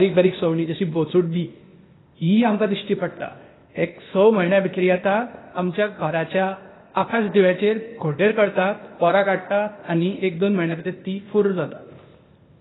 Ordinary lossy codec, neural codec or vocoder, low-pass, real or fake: AAC, 16 kbps; codec, 16 kHz, 0.8 kbps, ZipCodec; 7.2 kHz; fake